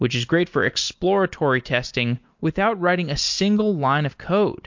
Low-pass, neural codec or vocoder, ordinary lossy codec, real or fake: 7.2 kHz; none; MP3, 48 kbps; real